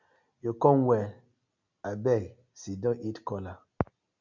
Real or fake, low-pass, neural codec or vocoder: real; 7.2 kHz; none